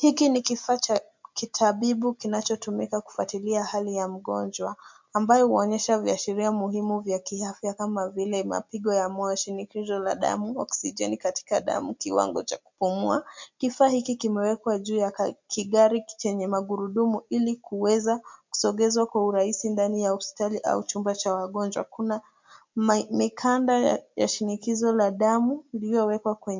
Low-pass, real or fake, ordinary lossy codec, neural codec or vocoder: 7.2 kHz; real; MP3, 64 kbps; none